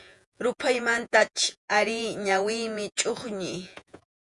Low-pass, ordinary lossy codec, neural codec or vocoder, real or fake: 10.8 kHz; MP3, 96 kbps; vocoder, 48 kHz, 128 mel bands, Vocos; fake